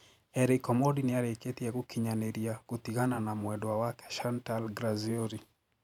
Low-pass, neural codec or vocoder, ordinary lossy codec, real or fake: 19.8 kHz; vocoder, 44.1 kHz, 128 mel bands every 512 samples, BigVGAN v2; none; fake